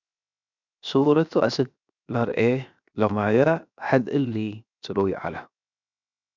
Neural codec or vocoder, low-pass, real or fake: codec, 16 kHz, 0.7 kbps, FocalCodec; 7.2 kHz; fake